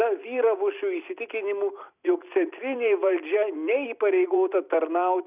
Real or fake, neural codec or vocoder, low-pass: fake; vocoder, 44.1 kHz, 128 mel bands every 256 samples, BigVGAN v2; 3.6 kHz